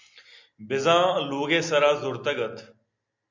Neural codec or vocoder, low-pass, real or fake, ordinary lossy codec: none; 7.2 kHz; real; MP3, 64 kbps